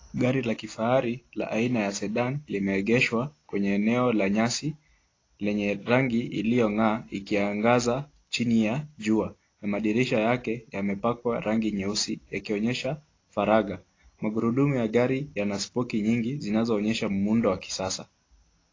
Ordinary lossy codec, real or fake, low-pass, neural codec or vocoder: AAC, 32 kbps; real; 7.2 kHz; none